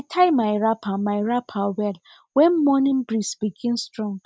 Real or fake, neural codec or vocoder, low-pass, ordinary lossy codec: real; none; none; none